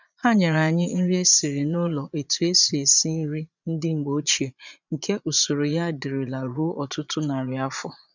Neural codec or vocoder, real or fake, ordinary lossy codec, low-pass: vocoder, 22.05 kHz, 80 mel bands, Vocos; fake; none; 7.2 kHz